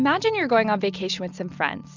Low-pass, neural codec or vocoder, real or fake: 7.2 kHz; none; real